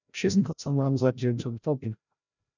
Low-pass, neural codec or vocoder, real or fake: 7.2 kHz; codec, 16 kHz, 0.5 kbps, FreqCodec, larger model; fake